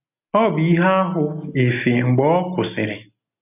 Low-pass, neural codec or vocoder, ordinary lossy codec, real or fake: 3.6 kHz; none; none; real